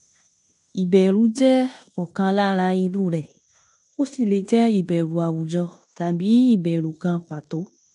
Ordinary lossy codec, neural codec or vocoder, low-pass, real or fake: none; codec, 16 kHz in and 24 kHz out, 0.9 kbps, LongCat-Audio-Codec, fine tuned four codebook decoder; 10.8 kHz; fake